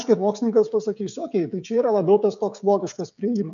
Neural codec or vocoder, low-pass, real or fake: codec, 16 kHz, 16 kbps, FreqCodec, smaller model; 7.2 kHz; fake